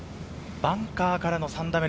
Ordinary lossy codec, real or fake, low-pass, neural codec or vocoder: none; real; none; none